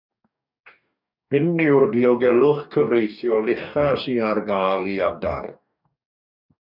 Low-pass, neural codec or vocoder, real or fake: 5.4 kHz; codec, 44.1 kHz, 2.6 kbps, DAC; fake